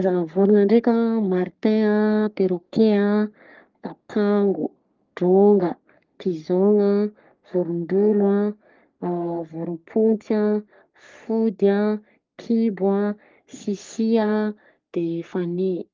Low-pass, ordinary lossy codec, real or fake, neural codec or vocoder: 7.2 kHz; Opus, 32 kbps; fake; codec, 44.1 kHz, 3.4 kbps, Pupu-Codec